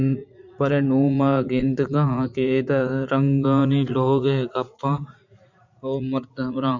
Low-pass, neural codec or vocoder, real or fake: 7.2 kHz; vocoder, 44.1 kHz, 80 mel bands, Vocos; fake